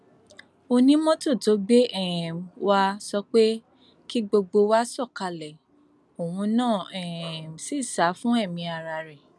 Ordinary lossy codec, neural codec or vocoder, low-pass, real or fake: none; none; none; real